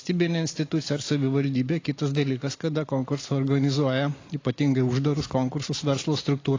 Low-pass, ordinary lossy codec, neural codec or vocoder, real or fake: 7.2 kHz; AAC, 32 kbps; none; real